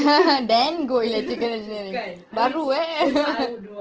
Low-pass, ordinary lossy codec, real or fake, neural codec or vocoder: 7.2 kHz; Opus, 16 kbps; real; none